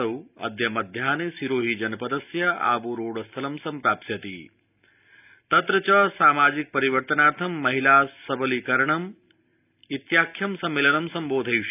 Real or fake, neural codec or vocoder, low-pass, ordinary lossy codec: real; none; 3.6 kHz; none